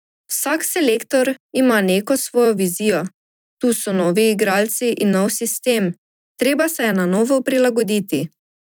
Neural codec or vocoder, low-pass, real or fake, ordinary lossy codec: vocoder, 44.1 kHz, 128 mel bands every 256 samples, BigVGAN v2; none; fake; none